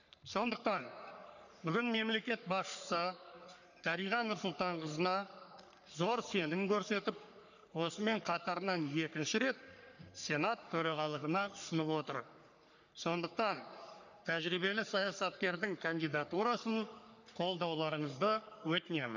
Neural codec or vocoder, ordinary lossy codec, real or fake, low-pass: codec, 44.1 kHz, 3.4 kbps, Pupu-Codec; none; fake; 7.2 kHz